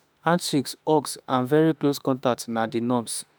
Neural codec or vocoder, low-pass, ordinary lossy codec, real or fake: autoencoder, 48 kHz, 32 numbers a frame, DAC-VAE, trained on Japanese speech; none; none; fake